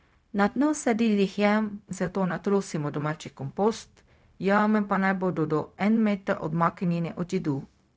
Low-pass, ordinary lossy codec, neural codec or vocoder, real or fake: none; none; codec, 16 kHz, 0.4 kbps, LongCat-Audio-Codec; fake